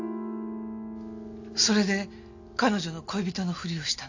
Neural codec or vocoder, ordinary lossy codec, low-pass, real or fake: none; AAC, 48 kbps; 7.2 kHz; real